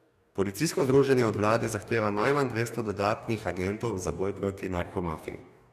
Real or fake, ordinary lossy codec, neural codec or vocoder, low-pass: fake; none; codec, 44.1 kHz, 2.6 kbps, DAC; 14.4 kHz